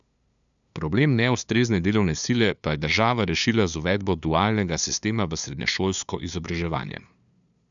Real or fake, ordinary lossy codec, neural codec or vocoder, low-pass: fake; none; codec, 16 kHz, 2 kbps, FunCodec, trained on LibriTTS, 25 frames a second; 7.2 kHz